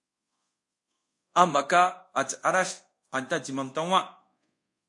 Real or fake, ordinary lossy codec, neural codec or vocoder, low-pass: fake; MP3, 48 kbps; codec, 24 kHz, 0.5 kbps, DualCodec; 10.8 kHz